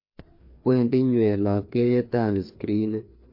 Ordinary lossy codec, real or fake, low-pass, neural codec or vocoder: MP3, 32 kbps; fake; 5.4 kHz; codec, 16 kHz, 2 kbps, FreqCodec, larger model